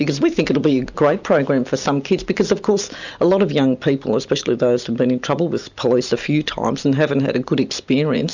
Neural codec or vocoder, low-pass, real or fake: none; 7.2 kHz; real